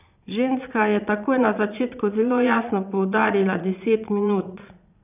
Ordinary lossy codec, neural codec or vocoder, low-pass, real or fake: none; vocoder, 22.05 kHz, 80 mel bands, Vocos; 3.6 kHz; fake